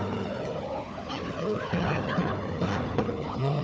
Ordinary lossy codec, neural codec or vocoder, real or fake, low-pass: none; codec, 16 kHz, 4 kbps, FunCodec, trained on Chinese and English, 50 frames a second; fake; none